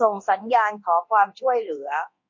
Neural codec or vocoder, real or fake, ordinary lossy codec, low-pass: codec, 24 kHz, 0.9 kbps, DualCodec; fake; MP3, 48 kbps; 7.2 kHz